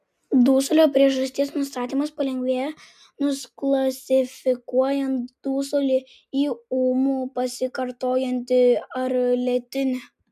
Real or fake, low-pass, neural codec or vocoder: real; 14.4 kHz; none